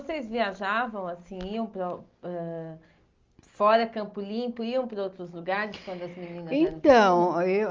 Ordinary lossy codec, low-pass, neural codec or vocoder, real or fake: Opus, 32 kbps; 7.2 kHz; none; real